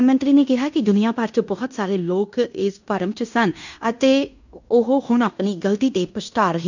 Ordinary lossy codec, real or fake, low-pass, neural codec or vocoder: none; fake; 7.2 kHz; codec, 16 kHz in and 24 kHz out, 0.9 kbps, LongCat-Audio-Codec, fine tuned four codebook decoder